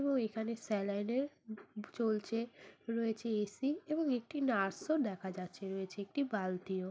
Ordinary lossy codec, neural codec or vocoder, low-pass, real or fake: none; none; none; real